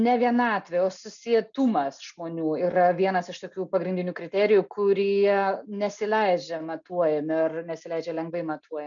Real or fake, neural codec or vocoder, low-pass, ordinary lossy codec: real; none; 7.2 kHz; MP3, 96 kbps